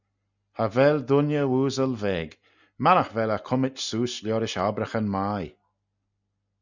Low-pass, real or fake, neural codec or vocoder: 7.2 kHz; real; none